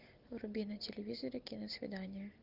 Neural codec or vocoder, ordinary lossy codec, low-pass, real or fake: none; Opus, 32 kbps; 5.4 kHz; real